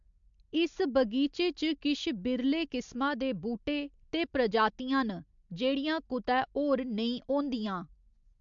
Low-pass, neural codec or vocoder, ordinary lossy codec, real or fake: 7.2 kHz; none; MP3, 64 kbps; real